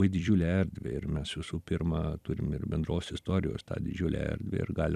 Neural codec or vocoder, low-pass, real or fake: vocoder, 44.1 kHz, 128 mel bands every 256 samples, BigVGAN v2; 14.4 kHz; fake